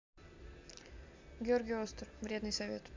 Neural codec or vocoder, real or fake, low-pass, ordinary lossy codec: none; real; 7.2 kHz; MP3, 64 kbps